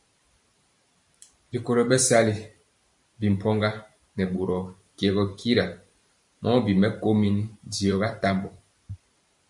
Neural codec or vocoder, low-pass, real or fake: vocoder, 44.1 kHz, 128 mel bands every 512 samples, BigVGAN v2; 10.8 kHz; fake